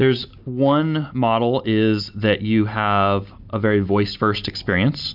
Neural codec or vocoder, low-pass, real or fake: none; 5.4 kHz; real